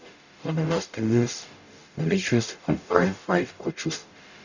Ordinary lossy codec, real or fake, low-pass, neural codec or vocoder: none; fake; 7.2 kHz; codec, 44.1 kHz, 0.9 kbps, DAC